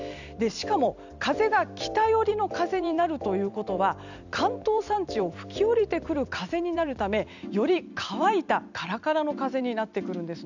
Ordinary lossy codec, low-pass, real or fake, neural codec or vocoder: none; 7.2 kHz; fake; vocoder, 44.1 kHz, 128 mel bands every 256 samples, BigVGAN v2